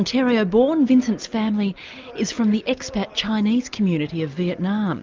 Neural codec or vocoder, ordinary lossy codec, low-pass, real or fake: none; Opus, 24 kbps; 7.2 kHz; real